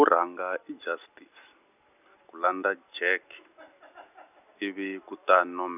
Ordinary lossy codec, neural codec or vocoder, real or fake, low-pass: none; none; real; 3.6 kHz